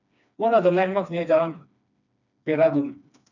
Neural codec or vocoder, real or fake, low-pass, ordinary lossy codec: codec, 16 kHz, 2 kbps, FreqCodec, smaller model; fake; 7.2 kHz; none